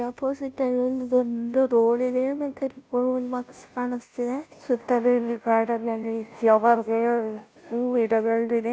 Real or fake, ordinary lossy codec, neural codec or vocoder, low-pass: fake; none; codec, 16 kHz, 0.5 kbps, FunCodec, trained on Chinese and English, 25 frames a second; none